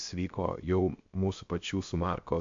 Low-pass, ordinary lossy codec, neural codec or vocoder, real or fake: 7.2 kHz; MP3, 48 kbps; codec, 16 kHz, 0.7 kbps, FocalCodec; fake